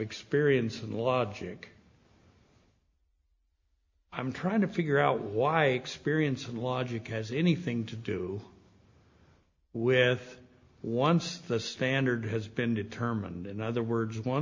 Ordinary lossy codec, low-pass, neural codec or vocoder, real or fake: MP3, 48 kbps; 7.2 kHz; none; real